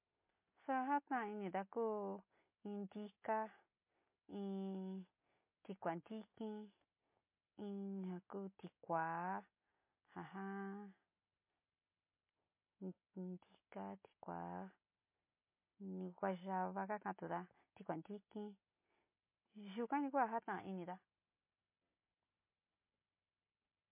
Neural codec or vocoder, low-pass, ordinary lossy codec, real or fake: none; 3.6 kHz; MP3, 24 kbps; real